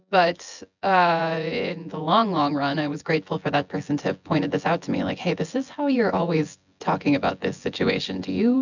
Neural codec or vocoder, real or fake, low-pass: vocoder, 24 kHz, 100 mel bands, Vocos; fake; 7.2 kHz